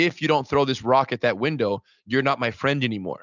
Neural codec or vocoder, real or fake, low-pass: none; real; 7.2 kHz